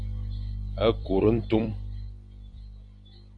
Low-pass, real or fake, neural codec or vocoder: 9.9 kHz; fake; vocoder, 44.1 kHz, 128 mel bands every 256 samples, BigVGAN v2